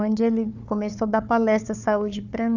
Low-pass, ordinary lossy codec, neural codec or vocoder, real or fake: 7.2 kHz; none; codec, 16 kHz, 4 kbps, FunCodec, trained on Chinese and English, 50 frames a second; fake